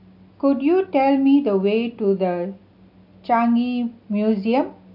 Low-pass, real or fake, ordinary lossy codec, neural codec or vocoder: 5.4 kHz; real; AAC, 48 kbps; none